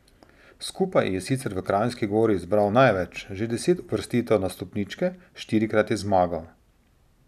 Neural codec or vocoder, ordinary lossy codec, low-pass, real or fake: none; none; 14.4 kHz; real